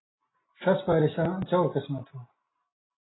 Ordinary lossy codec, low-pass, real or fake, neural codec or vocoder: AAC, 16 kbps; 7.2 kHz; real; none